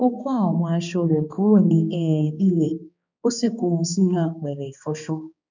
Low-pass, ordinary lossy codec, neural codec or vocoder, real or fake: 7.2 kHz; none; codec, 16 kHz, 2 kbps, X-Codec, HuBERT features, trained on balanced general audio; fake